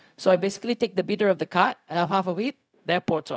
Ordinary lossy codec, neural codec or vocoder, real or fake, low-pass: none; codec, 16 kHz, 0.4 kbps, LongCat-Audio-Codec; fake; none